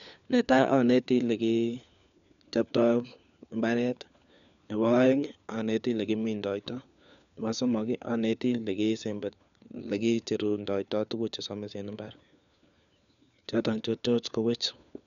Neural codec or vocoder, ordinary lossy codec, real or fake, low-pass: codec, 16 kHz, 4 kbps, FunCodec, trained on LibriTTS, 50 frames a second; none; fake; 7.2 kHz